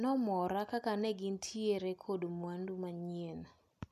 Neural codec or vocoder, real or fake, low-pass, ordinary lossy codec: none; real; 14.4 kHz; none